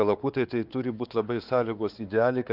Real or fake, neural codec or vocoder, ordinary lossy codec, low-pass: fake; codec, 16 kHz, 4 kbps, X-Codec, WavLM features, trained on Multilingual LibriSpeech; Opus, 24 kbps; 5.4 kHz